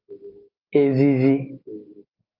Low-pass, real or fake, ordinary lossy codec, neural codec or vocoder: 5.4 kHz; real; Opus, 24 kbps; none